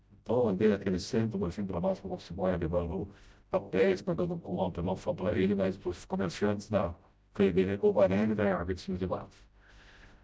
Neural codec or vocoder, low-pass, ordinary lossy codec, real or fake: codec, 16 kHz, 0.5 kbps, FreqCodec, smaller model; none; none; fake